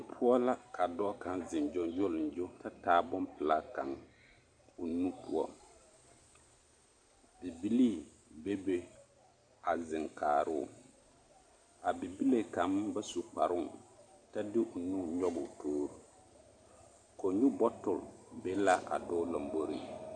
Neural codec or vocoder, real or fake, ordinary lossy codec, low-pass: none; real; AAC, 64 kbps; 9.9 kHz